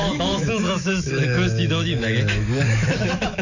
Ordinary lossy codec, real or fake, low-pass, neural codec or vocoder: none; fake; 7.2 kHz; autoencoder, 48 kHz, 128 numbers a frame, DAC-VAE, trained on Japanese speech